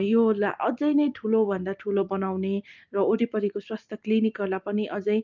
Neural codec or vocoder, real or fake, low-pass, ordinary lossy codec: none; real; 7.2 kHz; Opus, 24 kbps